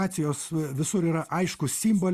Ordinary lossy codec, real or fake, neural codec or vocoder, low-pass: Opus, 64 kbps; real; none; 14.4 kHz